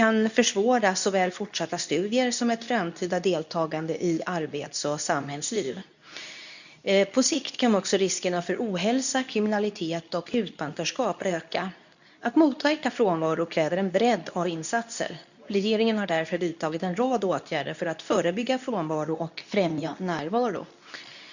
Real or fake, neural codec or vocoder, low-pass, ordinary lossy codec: fake; codec, 24 kHz, 0.9 kbps, WavTokenizer, medium speech release version 2; 7.2 kHz; none